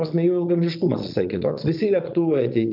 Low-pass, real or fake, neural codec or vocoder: 5.4 kHz; fake; vocoder, 22.05 kHz, 80 mel bands, Vocos